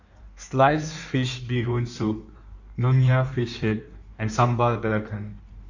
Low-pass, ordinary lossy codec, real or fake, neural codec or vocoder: 7.2 kHz; none; fake; codec, 16 kHz in and 24 kHz out, 1.1 kbps, FireRedTTS-2 codec